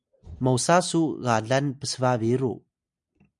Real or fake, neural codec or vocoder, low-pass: real; none; 10.8 kHz